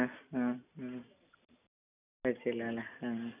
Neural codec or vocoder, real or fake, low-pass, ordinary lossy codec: none; real; 3.6 kHz; none